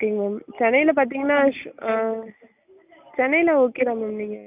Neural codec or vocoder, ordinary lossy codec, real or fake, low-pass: none; none; real; 3.6 kHz